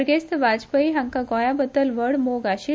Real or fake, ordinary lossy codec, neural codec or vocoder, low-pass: real; none; none; none